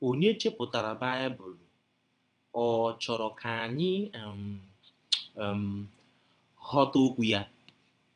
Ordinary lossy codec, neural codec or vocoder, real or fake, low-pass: none; vocoder, 22.05 kHz, 80 mel bands, WaveNeXt; fake; 9.9 kHz